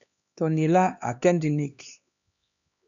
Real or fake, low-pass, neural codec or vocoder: fake; 7.2 kHz; codec, 16 kHz, 2 kbps, X-Codec, HuBERT features, trained on LibriSpeech